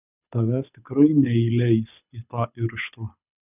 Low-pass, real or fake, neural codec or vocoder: 3.6 kHz; fake; codec, 24 kHz, 6 kbps, HILCodec